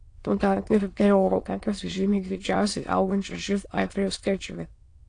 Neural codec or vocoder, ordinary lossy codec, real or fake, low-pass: autoencoder, 22.05 kHz, a latent of 192 numbers a frame, VITS, trained on many speakers; AAC, 48 kbps; fake; 9.9 kHz